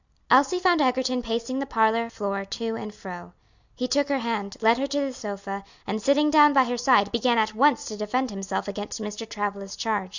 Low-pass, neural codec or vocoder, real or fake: 7.2 kHz; none; real